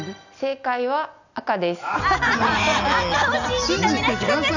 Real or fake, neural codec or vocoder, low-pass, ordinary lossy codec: real; none; 7.2 kHz; none